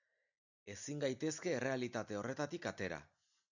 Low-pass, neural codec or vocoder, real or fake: 7.2 kHz; none; real